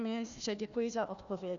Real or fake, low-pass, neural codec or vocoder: fake; 7.2 kHz; codec, 16 kHz, 1 kbps, FunCodec, trained on Chinese and English, 50 frames a second